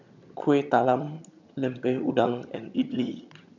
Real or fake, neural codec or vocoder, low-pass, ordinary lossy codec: fake; vocoder, 22.05 kHz, 80 mel bands, HiFi-GAN; 7.2 kHz; none